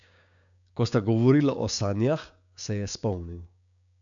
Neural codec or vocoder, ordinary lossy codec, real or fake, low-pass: codec, 16 kHz, 6 kbps, DAC; none; fake; 7.2 kHz